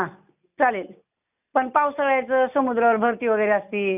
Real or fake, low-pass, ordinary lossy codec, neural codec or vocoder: real; 3.6 kHz; none; none